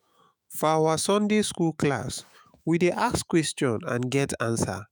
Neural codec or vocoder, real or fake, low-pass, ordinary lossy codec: autoencoder, 48 kHz, 128 numbers a frame, DAC-VAE, trained on Japanese speech; fake; none; none